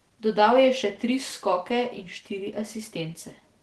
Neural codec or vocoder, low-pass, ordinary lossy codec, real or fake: none; 10.8 kHz; Opus, 16 kbps; real